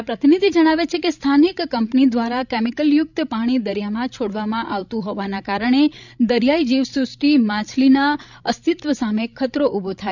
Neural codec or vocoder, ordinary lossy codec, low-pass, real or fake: codec, 16 kHz, 16 kbps, FreqCodec, larger model; none; 7.2 kHz; fake